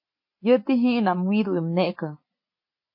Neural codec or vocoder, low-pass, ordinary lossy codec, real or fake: none; 5.4 kHz; MP3, 32 kbps; real